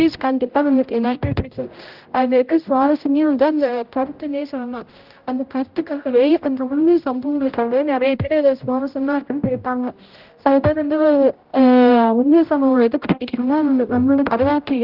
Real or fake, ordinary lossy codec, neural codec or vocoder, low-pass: fake; Opus, 32 kbps; codec, 16 kHz, 0.5 kbps, X-Codec, HuBERT features, trained on general audio; 5.4 kHz